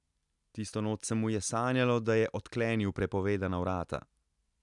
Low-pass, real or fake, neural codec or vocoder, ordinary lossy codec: 10.8 kHz; real; none; none